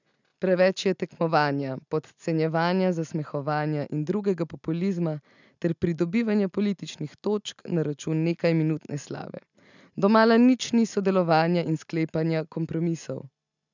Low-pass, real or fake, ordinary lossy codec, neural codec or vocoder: 7.2 kHz; real; none; none